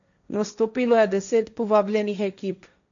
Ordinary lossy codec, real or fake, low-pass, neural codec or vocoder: MP3, 96 kbps; fake; 7.2 kHz; codec, 16 kHz, 1.1 kbps, Voila-Tokenizer